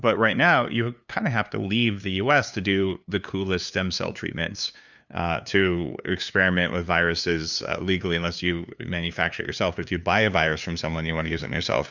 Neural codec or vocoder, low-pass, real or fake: codec, 16 kHz, 2 kbps, FunCodec, trained on LibriTTS, 25 frames a second; 7.2 kHz; fake